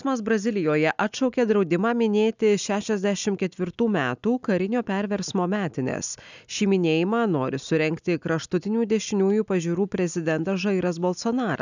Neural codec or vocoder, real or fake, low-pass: none; real; 7.2 kHz